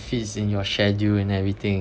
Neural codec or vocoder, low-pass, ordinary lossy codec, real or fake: none; none; none; real